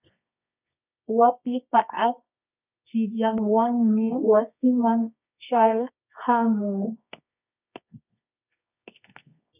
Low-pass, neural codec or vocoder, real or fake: 3.6 kHz; codec, 24 kHz, 0.9 kbps, WavTokenizer, medium music audio release; fake